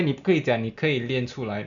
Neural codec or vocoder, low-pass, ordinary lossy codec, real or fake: none; 7.2 kHz; none; real